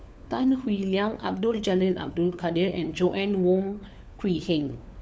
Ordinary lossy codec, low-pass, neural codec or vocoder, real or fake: none; none; codec, 16 kHz, 4 kbps, FunCodec, trained on LibriTTS, 50 frames a second; fake